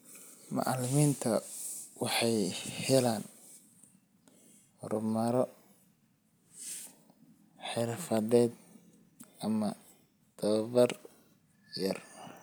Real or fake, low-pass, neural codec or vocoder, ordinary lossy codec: real; none; none; none